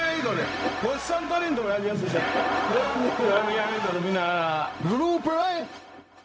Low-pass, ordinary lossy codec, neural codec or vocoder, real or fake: none; none; codec, 16 kHz, 0.4 kbps, LongCat-Audio-Codec; fake